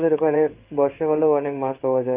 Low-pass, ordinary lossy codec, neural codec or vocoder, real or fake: 3.6 kHz; Opus, 32 kbps; codec, 16 kHz in and 24 kHz out, 1 kbps, XY-Tokenizer; fake